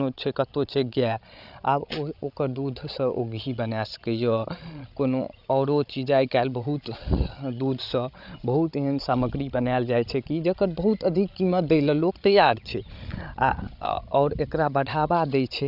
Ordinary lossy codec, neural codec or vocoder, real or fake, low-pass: none; codec, 16 kHz, 16 kbps, FreqCodec, larger model; fake; 5.4 kHz